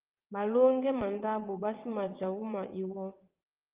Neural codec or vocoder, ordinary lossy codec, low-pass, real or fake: vocoder, 22.05 kHz, 80 mel bands, WaveNeXt; Opus, 24 kbps; 3.6 kHz; fake